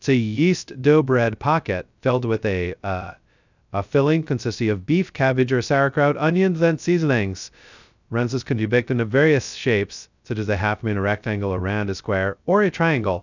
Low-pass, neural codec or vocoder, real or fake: 7.2 kHz; codec, 16 kHz, 0.2 kbps, FocalCodec; fake